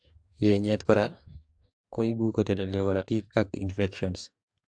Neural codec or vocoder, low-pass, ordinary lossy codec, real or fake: codec, 44.1 kHz, 2.6 kbps, DAC; 9.9 kHz; AAC, 64 kbps; fake